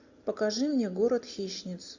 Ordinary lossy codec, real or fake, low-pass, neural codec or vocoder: AAC, 48 kbps; real; 7.2 kHz; none